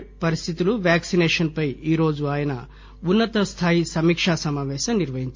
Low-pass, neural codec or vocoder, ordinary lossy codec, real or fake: 7.2 kHz; none; MP3, 48 kbps; real